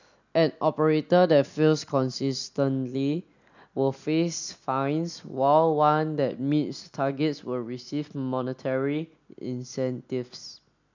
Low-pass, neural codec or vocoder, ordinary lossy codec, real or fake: 7.2 kHz; none; none; real